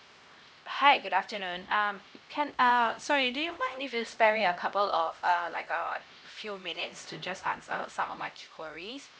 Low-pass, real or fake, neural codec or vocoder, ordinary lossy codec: none; fake; codec, 16 kHz, 1 kbps, X-Codec, HuBERT features, trained on LibriSpeech; none